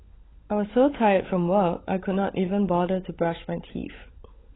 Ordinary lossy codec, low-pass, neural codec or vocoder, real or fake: AAC, 16 kbps; 7.2 kHz; codec, 16 kHz, 16 kbps, FunCodec, trained on LibriTTS, 50 frames a second; fake